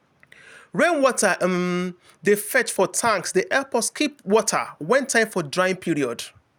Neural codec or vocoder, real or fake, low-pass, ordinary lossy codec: none; real; none; none